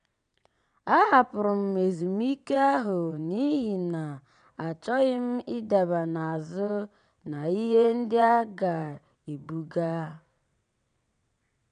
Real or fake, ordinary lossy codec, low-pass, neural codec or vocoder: fake; none; 9.9 kHz; vocoder, 22.05 kHz, 80 mel bands, WaveNeXt